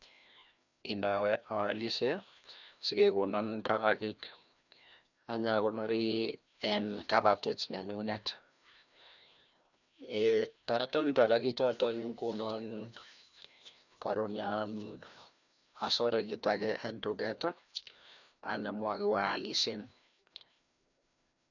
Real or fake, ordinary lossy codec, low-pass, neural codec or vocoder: fake; none; 7.2 kHz; codec, 16 kHz, 1 kbps, FreqCodec, larger model